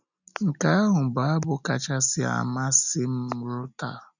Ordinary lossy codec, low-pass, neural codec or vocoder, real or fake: none; 7.2 kHz; none; real